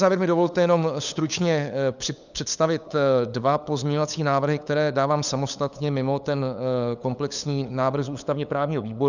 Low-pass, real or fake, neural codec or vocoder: 7.2 kHz; fake; codec, 16 kHz, 8 kbps, FunCodec, trained on LibriTTS, 25 frames a second